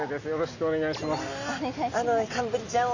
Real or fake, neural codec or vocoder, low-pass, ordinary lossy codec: real; none; 7.2 kHz; none